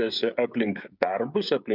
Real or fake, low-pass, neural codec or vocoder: fake; 5.4 kHz; codec, 16 kHz, 16 kbps, FreqCodec, smaller model